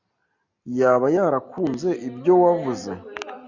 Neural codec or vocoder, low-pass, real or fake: none; 7.2 kHz; real